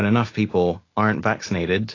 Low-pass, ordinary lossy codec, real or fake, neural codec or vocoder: 7.2 kHz; AAC, 32 kbps; real; none